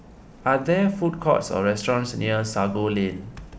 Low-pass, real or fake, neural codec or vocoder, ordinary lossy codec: none; real; none; none